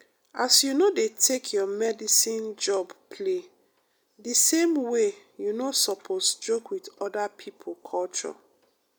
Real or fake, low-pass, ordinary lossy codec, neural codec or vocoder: real; none; none; none